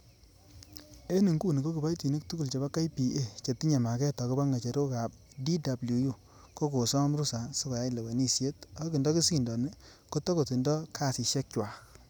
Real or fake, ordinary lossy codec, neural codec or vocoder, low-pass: real; none; none; none